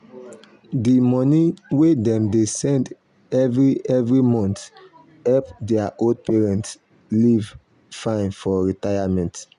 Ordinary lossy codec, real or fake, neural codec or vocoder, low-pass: none; real; none; 10.8 kHz